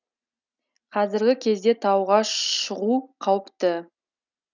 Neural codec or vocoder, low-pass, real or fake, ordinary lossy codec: none; 7.2 kHz; real; none